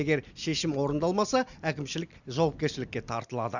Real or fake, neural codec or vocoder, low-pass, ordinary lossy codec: real; none; 7.2 kHz; none